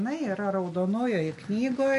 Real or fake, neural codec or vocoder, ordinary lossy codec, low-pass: real; none; MP3, 48 kbps; 14.4 kHz